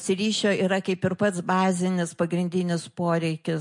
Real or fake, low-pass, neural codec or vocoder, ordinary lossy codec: real; 10.8 kHz; none; MP3, 48 kbps